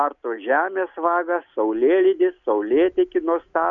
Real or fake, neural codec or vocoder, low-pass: real; none; 7.2 kHz